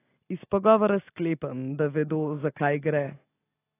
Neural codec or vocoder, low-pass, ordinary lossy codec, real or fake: none; 3.6 kHz; AAC, 16 kbps; real